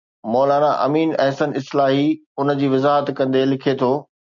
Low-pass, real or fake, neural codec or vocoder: 7.2 kHz; real; none